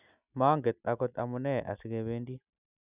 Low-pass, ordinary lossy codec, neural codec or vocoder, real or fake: 3.6 kHz; none; none; real